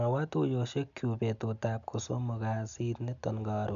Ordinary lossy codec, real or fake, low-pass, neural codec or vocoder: none; real; 7.2 kHz; none